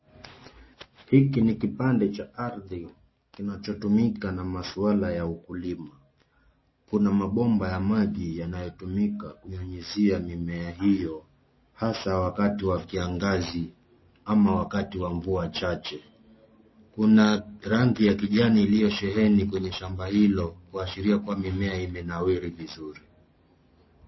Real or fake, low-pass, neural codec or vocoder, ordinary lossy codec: real; 7.2 kHz; none; MP3, 24 kbps